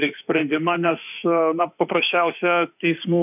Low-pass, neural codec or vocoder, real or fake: 3.6 kHz; autoencoder, 48 kHz, 32 numbers a frame, DAC-VAE, trained on Japanese speech; fake